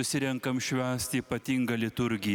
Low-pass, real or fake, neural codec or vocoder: 19.8 kHz; real; none